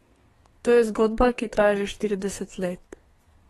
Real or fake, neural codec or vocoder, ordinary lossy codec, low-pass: fake; codec, 32 kHz, 1.9 kbps, SNAC; AAC, 32 kbps; 14.4 kHz